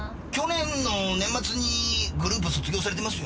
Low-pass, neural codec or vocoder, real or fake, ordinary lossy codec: none; none; real; none